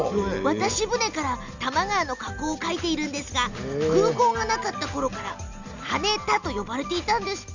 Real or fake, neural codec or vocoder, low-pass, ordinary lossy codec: real; none; 7.2 kHz; none